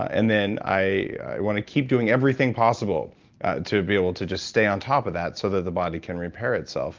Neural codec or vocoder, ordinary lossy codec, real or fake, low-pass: none; Opus, 24 kbps; real; 7.2 kHz